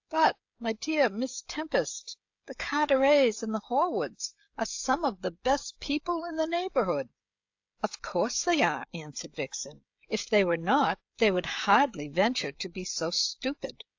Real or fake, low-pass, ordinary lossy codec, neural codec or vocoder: fake; 7.2 kHz; AAC, 48 kbps; codec, 16 kHz, 16 kbps, FreqCodec, smaller model